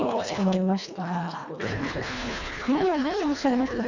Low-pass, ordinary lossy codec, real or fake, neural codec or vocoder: 7.2 kHz; none; fake; codec, 24 kHz, 1.5 kbps, HILCodec